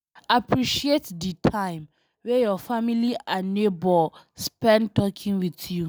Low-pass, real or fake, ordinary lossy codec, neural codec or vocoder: none; real; none; none